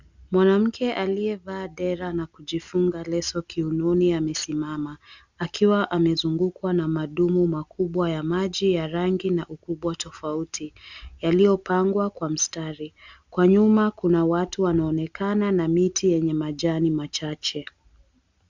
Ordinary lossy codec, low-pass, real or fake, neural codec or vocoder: Opus, 64 kbps; 7.2 kHz; real; none